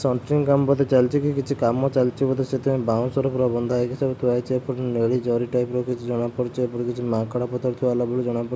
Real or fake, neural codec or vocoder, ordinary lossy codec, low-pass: real; none; none; none